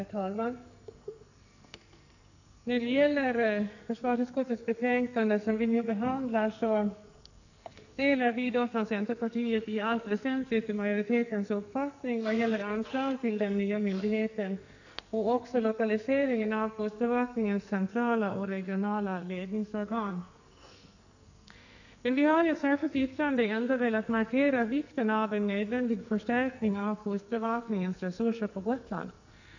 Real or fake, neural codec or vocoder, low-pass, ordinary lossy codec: fake; codec, 44.1 kHz, 2.6 kbps, SNAC; 7.2 kHz; none